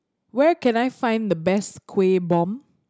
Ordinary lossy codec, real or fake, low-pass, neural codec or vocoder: none; real; none; none